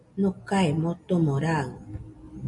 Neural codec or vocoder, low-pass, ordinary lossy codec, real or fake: none; 10.8 kHz; MP3, 64 kbps; real